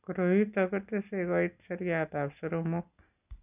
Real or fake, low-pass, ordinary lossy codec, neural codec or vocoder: real; 3.6 kHz; none; none